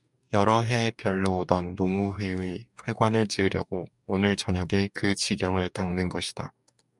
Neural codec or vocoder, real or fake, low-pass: codec, 44.1 kHz, 2.6 kbps, DAC; fake; 10.8 kHz